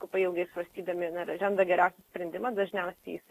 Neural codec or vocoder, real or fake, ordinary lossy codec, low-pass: none; real; AAC, 48 kbps; 14.4 kHz